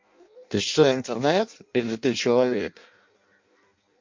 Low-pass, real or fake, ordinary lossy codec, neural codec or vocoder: 7.2 kHz; fake; MP3, 48 kbps; codec, 16 kHz in and 24 kHz out, 0.6 kbps, FireRedTTS-2 codec